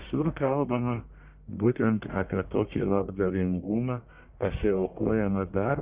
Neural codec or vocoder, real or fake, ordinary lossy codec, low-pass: codec, 44.1 kHz, 1.7 kbps, Pupu-Codec; fake; Opus, 64 kbps; 3.6 kHz